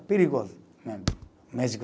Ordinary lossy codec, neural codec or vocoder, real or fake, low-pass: none; none; real; none